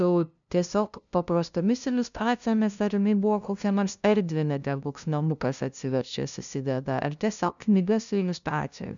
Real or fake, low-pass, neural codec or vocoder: fake; 7.2 kHz; codec, 16 kHz, 0.5 kbps, FunCodec, trained on LibriTTS, 25 frames a second